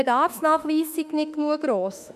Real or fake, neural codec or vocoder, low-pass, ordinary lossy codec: fake; autoencoder, 48 kHz, 32 numbers a frame, DAC-VAE, trained on Japanese speech; 14.4 kHz; none